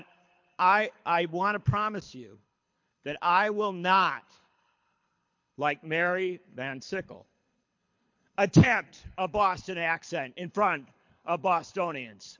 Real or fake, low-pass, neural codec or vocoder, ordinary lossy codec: fake; 7.2 kHz; codec, 24 kHz, 6 kbps, HILCodec; MP3, 48 kbps